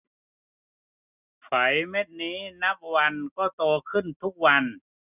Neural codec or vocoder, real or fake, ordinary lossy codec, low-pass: none; real; none; 3.6 kHz